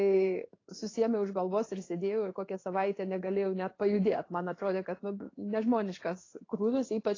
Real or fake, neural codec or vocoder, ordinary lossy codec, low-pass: fake; codec, 16 kHz in and 24 kHz out, 1 kbps, XY-Tokenizer; AAC, 32 kbps; 7.2 kHz